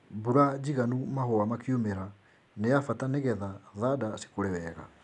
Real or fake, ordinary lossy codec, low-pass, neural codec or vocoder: real; none; 10.8 kHz; none